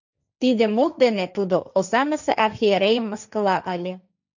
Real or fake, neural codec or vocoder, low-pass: fake; codec, 16 kHz, 1.1 kbps, Voila-Tokenizer; 7.2 kHz